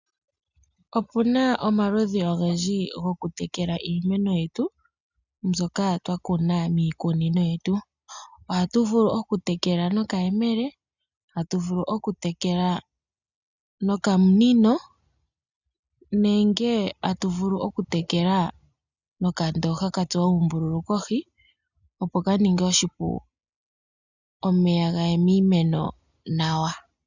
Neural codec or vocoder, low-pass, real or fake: none; 7.2 kHz; real